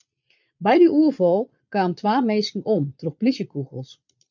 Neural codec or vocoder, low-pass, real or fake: vocoder, 22.05 kHz, 80 mel bands, Vocos; 7.2 kHz; fake